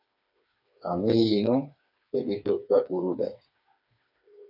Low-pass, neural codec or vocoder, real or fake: 5.4 kHz; codec, 16 kHz, 4 kbps, FreqCodec, smaller model; fake